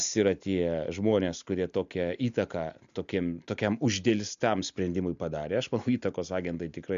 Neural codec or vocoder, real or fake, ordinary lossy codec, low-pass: none; real; MP3, 64 kbps; 7.2 kHz